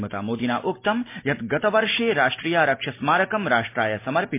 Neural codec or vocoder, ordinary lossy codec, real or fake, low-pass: none; MP3, 24 kbps; real; 3.6 kHz